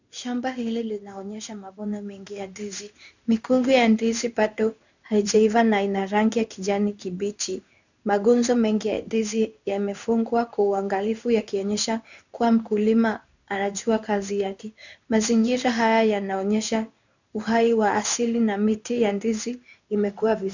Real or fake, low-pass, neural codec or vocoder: fake; 7.2 kHz; codec, 16 kHz in and 24 kHz out, 1 kbps, XY-Tokenizer